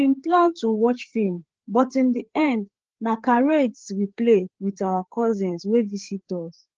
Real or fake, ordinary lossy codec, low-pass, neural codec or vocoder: fake; Opus, 16 kbps; 7.2 kHz; codec, 16 kHz, 4 kbps, FreqCodec, larger model